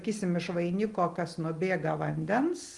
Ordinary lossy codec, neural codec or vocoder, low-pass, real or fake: Opus, 24 kbps; none; 10.8 kHz; real